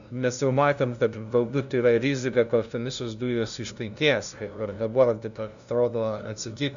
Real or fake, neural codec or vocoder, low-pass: fake; codec, 16 kHz, 0.5 kbps, FunCodec, trained on LibriTTS, 25 frames a second; 7.2 kHz